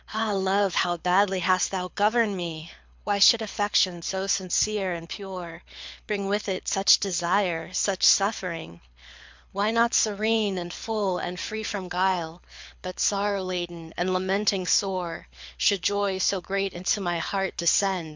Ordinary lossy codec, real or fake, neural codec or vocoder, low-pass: MP3, 64 kbps; fake; codec, 24 kHz, 6 kbps, HILCodec; 7.2 kHz